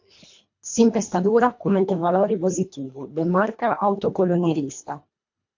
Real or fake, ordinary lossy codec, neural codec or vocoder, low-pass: fake; MP3, 48 kbps; codec, 24 kHz, 1.5 kbps, HILCodec; 7.2 kHz